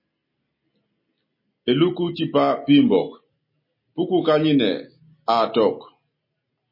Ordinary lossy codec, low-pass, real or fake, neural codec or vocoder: MP3, 24 kbps; 5.4 kHz; real; none